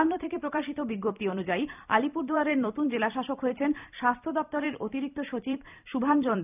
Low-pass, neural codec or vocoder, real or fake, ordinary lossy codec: 3.6 kHz; vocoder, 44.1 kHz, 128 mel bands every 256 samples, BigVGAN v2; fake; none